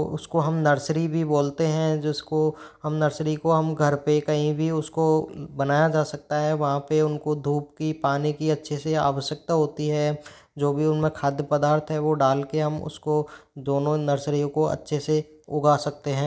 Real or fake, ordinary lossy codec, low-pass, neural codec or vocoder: real; none; none; none